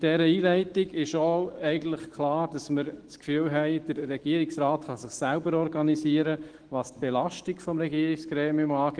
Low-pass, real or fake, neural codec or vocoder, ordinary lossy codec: 9.9 kHz; fake; autoencoder, 48 kHz, 128 numbers a frame, DAC-VAE, trained on Japanese speech; Opus, 16 kbps